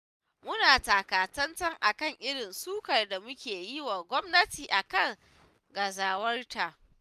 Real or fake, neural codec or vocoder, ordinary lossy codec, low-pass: real; none; none; 14.4 kHz